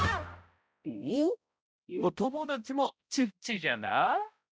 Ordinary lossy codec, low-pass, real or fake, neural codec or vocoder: none; none; fake; codec, 16 kHz, 0.5 kbps, X-Codec, HuBERT features, trained on balanced general audio